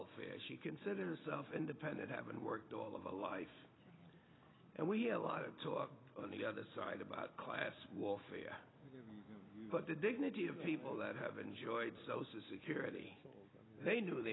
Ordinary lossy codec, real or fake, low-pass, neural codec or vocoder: AAC, 16 kbps; real; 7.2 kHz; none